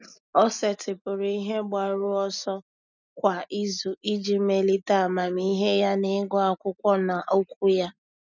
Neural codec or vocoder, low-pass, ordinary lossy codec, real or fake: none; 7.2 kHz; none; real